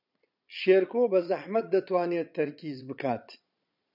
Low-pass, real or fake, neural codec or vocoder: 5.4 kHz; fake; vocoder, 22.05 kHz, 80 mel bands, Vocos